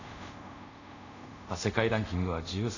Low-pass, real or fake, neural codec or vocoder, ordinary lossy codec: 7.2 kHz; fake; codec, 24 kHz, 0.5 kbps, DualCodec; none